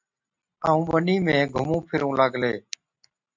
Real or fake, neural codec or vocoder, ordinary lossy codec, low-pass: real; none; MP3, 64 kbps; 7.2 kHz